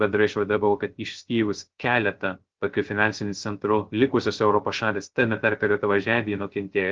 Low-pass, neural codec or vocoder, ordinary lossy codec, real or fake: 7.2 kHz; codec, 16 kHz, 0.3 kbps, FocalCodec; Opus, 16 kbps; fake